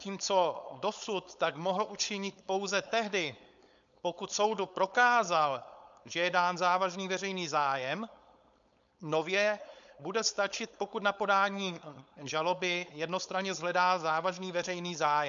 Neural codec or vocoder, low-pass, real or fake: codec, 16 kHz, 4.8 kbps, FACodec; 7.2 kHz; fake